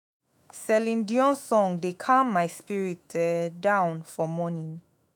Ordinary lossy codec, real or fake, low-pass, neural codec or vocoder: none; fake; none; autoencoder, 48 kHz, 128 numbers a frame, DAC-VAE, trained on Japanese speech